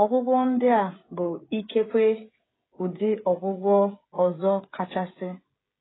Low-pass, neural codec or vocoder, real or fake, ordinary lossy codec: 7.2 kHz; codec, 16 kHz, 16 kbps, FreqCodec, smaller model; fake; AAC, 16 kbps